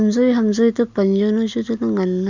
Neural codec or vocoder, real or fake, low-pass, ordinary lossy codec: none; real; 7.2 kHz; Opus, 64 kbps